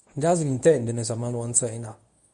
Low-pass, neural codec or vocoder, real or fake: 10.8 kHz; codec, 24 kHz, 0.9 kbps, WavTokenizer, medium speech release version 1; fake